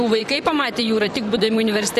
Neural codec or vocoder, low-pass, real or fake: vocoder, 44.1 kHz, 128 mel bands, Pupu-Vocoder; 14.4 kHz; fake